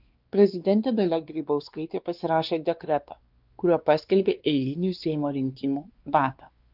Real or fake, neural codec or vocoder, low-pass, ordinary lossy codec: fake; codec, 16 kHz, 2 kbps, X-Codec, WavLM features, trained on Multilingual LibriSpeech; 5.4 kHz; Opus, 32 kbps